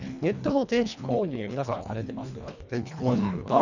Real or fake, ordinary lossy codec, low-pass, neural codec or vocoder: fake; none; 7.2 kHz; codec, 24 kHz, 1.5 kbps, HILCodec